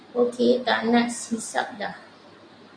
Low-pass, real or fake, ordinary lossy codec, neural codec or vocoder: 9.9 kHz; real; MP3, 64 kbps; none